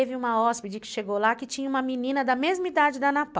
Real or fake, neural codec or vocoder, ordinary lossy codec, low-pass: real; none; none; none